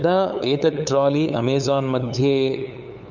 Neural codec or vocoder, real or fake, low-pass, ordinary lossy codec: codec, 16 kHz, 8 kbps, FunCodec, trained on LibriTTS, 25 frames a second; fake; 7.2 kHz; none